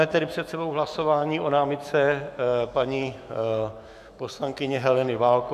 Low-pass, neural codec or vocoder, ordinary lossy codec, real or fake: 14.4 kHz; autoencoder, 48 kHz, 128 numbers a frame, DAC-VAE, trained on Japanese speech; AAC, 96 kbps; fake